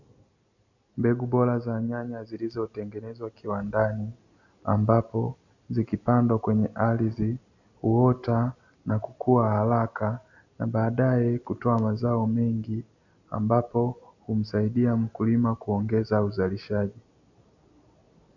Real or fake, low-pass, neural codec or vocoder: real; 7.2 kHz; none